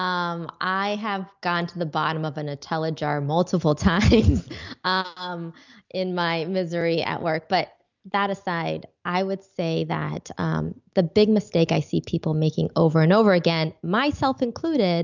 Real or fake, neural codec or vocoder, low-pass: real; none; 7.2 kHz